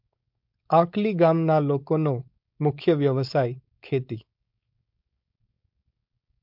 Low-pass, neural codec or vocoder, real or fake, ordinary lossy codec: 5.4 kHz; codec, 16 kHz, 4.8 kbps, FACodec; fake; MP3, 48 kbps